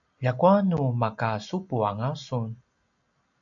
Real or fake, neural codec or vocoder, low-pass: real; none; 7.2 kHz